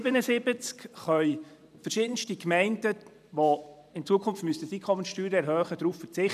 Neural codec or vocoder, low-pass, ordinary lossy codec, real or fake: vocoder, 44.1 kHz, 128 mel bands every 512 samples, BigVGAN v2; 14.4 kHz; none; fake